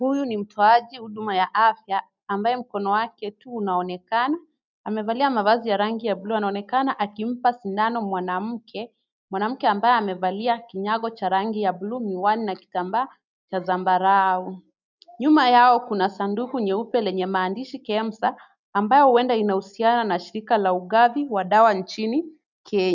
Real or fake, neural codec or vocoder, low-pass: real; none; 7.2 kHz